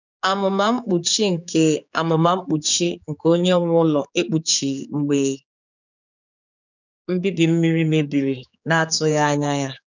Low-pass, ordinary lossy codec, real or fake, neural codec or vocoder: 7.2 kHz; none; fake; codec, 16 kHz, 4 kbps, X-Codec, HuBERT features, trained on general audio